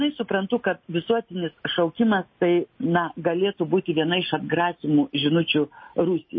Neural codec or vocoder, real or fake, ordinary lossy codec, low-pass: none; real; MP3, 24 kbps; 7.2 kHz